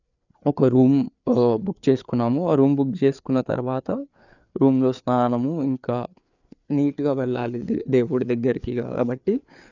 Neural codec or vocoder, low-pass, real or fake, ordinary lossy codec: codec, 16 kHz, 4 kbps, FreqCodec, larger model; 7.2 kHz; fake; none